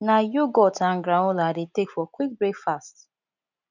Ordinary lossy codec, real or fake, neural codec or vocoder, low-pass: none; real; none; 7.2 kHz